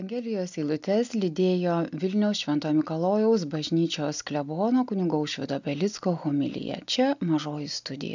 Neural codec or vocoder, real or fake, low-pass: none; real; 7.2 kHz